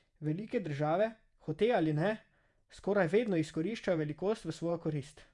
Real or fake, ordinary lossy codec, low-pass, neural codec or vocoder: fake; Opus, 64 kbps; 10.8 kHz; vocoder, 48 kHz, 128 mel bands, Vocos